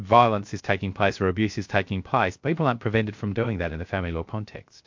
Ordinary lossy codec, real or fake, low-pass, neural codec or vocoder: MP3, 48 kbps; fake; 7.2 kHz; codec, 16 kHz, 0.3 kbps, FocalCodec